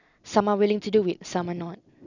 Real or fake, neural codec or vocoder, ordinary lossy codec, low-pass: real; none; none; 7.2 kHz